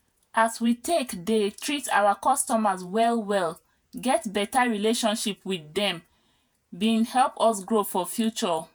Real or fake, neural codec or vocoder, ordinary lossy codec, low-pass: fake; vocoder, 48 kHz, 128 mel bands, Vocos; none; none